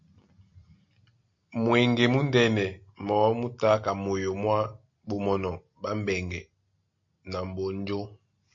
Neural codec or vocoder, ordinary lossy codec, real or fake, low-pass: none; MP3, 48 kbps; real; 7.2 kHz